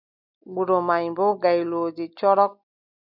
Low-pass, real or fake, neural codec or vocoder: 5.4 kHz; real; none